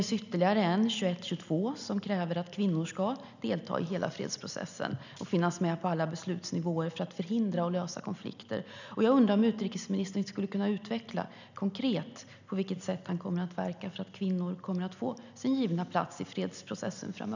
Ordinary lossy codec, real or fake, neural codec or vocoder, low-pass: none; real; none; 7.2 kHz